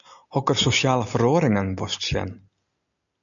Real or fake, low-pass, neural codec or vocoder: real; 7.2 kHz; none